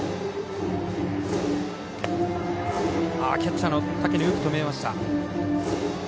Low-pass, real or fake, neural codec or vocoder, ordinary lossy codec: none; real; none; none